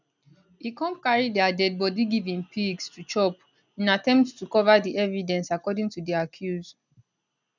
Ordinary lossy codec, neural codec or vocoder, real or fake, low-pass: none; none; real; 7.2 kHz